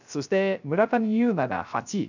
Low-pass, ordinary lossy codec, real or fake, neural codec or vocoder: 7.2 kHz; none; fake; codec, 16 kHz, 0.3 kbps, FocalCodec